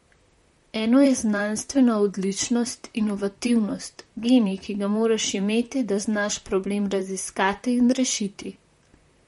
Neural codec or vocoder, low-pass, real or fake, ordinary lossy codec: vocoder, 44.1 kHz, 128 mel bands, Pupu-Vocoder; 19.8 kHz; fake; MP3, 48 kbps